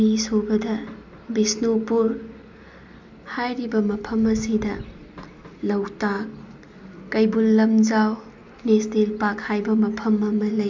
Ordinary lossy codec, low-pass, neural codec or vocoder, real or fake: MP3, 64 kbps; 7.2 kHz; none; real